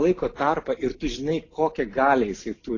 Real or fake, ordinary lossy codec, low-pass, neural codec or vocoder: real; AAC, 32 kbps; 7.2 kHz; none